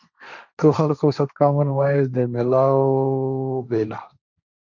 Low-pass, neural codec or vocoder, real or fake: 7.2 kHz; codec, 16 kHz, 1.1 kbps, Voila-Tokenizer; fake